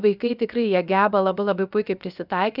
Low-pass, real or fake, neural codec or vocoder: 5.4 kHz; fake; codec, 16 kHz, about 1 kbps, DyCAST, with the encoder's durations